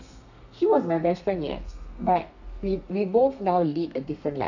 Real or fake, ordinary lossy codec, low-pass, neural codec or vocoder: fake; none; 7.2 kHz; codec, 32 kHz, 1.9 kbps, SNAC